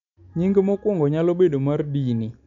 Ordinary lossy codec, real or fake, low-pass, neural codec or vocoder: MP3, 64 kbps; real; 7.2 kHz; none